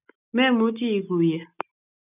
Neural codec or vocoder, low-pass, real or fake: none; 3.6 kHz; real